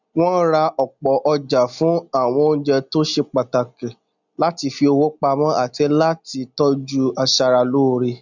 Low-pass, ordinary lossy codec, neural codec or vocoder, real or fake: 7.2 kHz; none; none; real